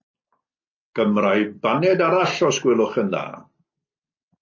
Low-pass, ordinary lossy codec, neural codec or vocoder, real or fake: 7.2 kHz; MP3, 32 kbps; none; real